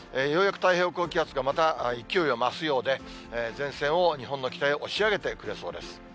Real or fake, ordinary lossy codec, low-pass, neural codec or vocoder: real; none; none; none